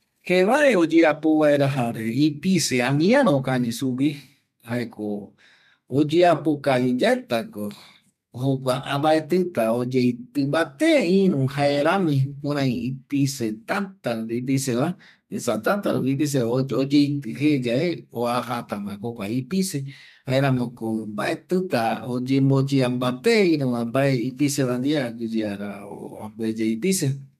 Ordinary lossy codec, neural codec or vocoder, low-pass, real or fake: MP3, 96 kbps; codec, 32 kHz, 1.9 kbps, SNAC; 14.4 kHz; fake